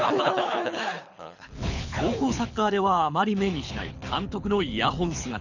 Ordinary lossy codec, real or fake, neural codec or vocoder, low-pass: none; fake; codec, 24 kHz, 6 kbps, HILCodec; 7.2 kHz